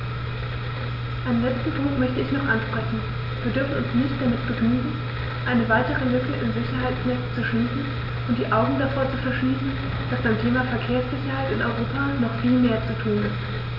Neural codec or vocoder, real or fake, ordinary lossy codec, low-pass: none; real; none; 5.4 kHz